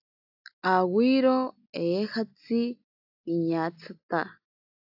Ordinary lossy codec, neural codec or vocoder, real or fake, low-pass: AAC, 48 kbps; none; real; 5.4 kHz